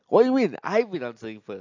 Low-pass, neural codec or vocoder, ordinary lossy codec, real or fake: 7.2 kHz; none; AAC, 48 kbps; real